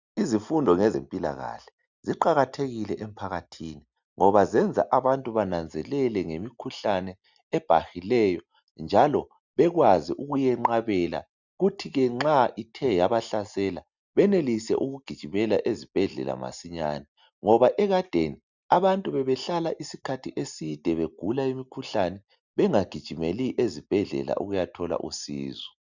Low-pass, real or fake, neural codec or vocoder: 7.2 kHz; real; none